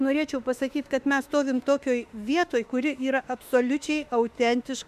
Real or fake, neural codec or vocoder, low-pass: fake; autoencoder, 48 kHz, 32 numbers a frame, DAC-VAE, trained on Japanese speech; 14.4 kHz